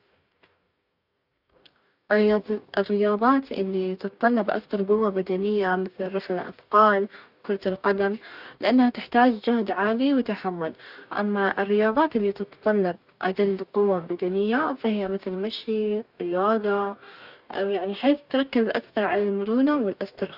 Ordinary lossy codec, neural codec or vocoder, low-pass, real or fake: none; codec, 44.1 kHz, 2.6 kbps, DAC; 5.4 kHz; fake